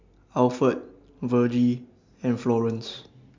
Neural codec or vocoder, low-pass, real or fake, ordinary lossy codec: none; 7.2 kHz; real; MP3, 64 kbps